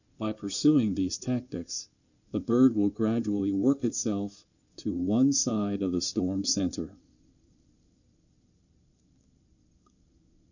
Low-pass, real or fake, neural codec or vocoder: 7.2 kHz; fake; codec, 16 kHz in and 24 kHz out, 2.2 kbps, FireRedTTS-2 codec